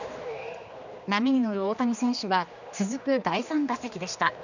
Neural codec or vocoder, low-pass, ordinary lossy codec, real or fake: codec, 16 kHz, 2 kbps, X-Codec, HuBERT features, trained on general audio; 7.2 kHz; none; fake